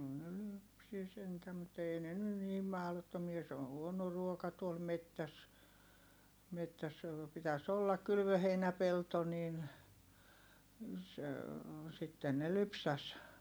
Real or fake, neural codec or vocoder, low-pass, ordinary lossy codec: real; none; none; none